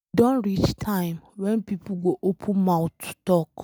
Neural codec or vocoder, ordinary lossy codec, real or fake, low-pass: none; none; real; none